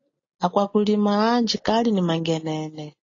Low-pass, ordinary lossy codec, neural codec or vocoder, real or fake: 7.2 kHz; AAC, 32 kbps; none; real